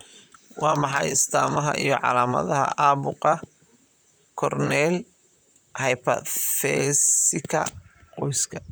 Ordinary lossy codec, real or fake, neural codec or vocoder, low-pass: none; fake; vocoder, 44.1 kHz, 128 mel bands, Pupu-Vocoder; none